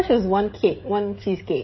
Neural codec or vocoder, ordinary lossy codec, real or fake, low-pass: codec, 16 kHz, 16 kbps, FreqCodec, smaller model; MP3, 24 kbps; fake; 7.2 kHz